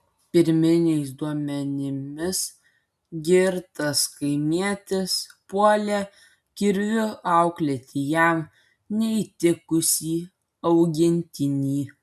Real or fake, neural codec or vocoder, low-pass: real; none; 14.4 kHz